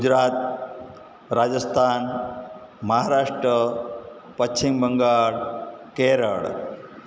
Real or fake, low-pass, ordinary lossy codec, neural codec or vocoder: real; none; none; none